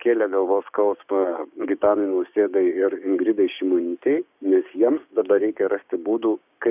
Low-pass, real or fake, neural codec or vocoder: 3.6 kHz; fake; codec, 16 kHz, 6 kbps, DAC